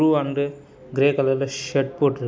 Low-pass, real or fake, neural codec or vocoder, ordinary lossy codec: none; real; none; none